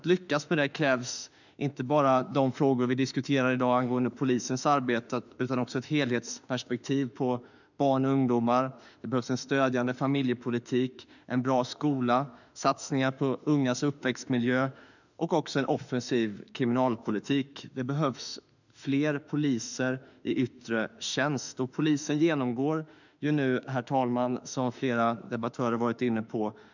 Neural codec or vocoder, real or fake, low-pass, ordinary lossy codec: autoencoder, 48 kHz, 32 numbers a frame, DAC-VAE, trained on Japanese speech; fake; 7.2 kHz; none